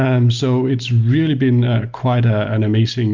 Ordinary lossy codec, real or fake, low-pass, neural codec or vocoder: Opus, 32 kbps; real; 7.2 kHz; none